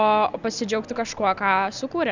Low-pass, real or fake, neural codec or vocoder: 7.2 kHz; real; none